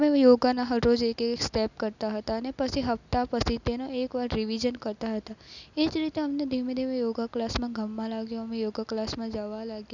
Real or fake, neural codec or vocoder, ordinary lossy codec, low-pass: real; none; none; 7.2 kHz